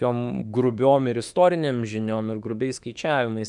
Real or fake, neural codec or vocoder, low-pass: fake; autoencoder, 48 kHz, 32 numbers a frame, DAC-VAE, trained on Japanese speech; 10.8 kHz